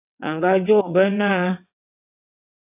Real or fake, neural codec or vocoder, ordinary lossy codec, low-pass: fake; vocoder, 22.05 kHz, 80 mel bands, WaveNeXt; AAC, 32 kbps; 3.6 kHz